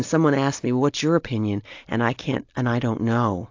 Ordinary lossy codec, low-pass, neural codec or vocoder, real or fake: AAC, 48 kbps; 7.2 kHz; none; real